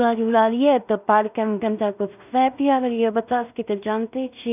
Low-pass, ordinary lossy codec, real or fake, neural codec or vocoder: 3.6 kHz; none; fake; codec, 16 kHz in and 24 kHz out, 0.4 kbps, LongCat-Audio-Codec, two codebook decoder